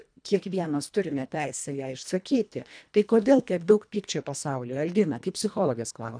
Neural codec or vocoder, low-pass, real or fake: codec, 24 kHz, 1.5 kbps, HILCodec; 9.9 kHz; fake